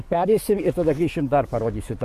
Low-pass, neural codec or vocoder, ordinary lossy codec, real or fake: 14.4 kHz; codec, 44.1 kHz, 7.8 kbps, DAC; MP3, 64 kbps; fake